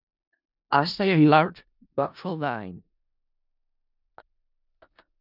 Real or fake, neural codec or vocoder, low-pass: fake; codec, 16 kHz in and 24 kHz out, 0.4 kbps, LongCat-Audio-Codec, four codebook decoder; 5.4 kHz